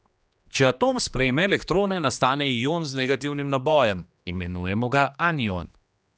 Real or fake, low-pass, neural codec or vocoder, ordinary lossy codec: fake; none; codec, 16 kHz, 2 kbps, X-Codec, HuBERT features, trained on general audio; none